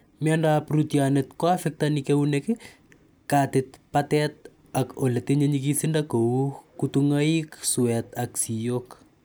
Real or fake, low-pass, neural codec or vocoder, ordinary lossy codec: real; none; none; none